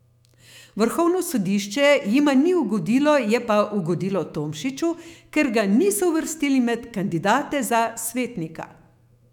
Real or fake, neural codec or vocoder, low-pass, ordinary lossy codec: fake; autoencoder, 48 kHz, 128 numbers a frame, DAC-VAE, trained on Japanese speech; 19.8 kHz; none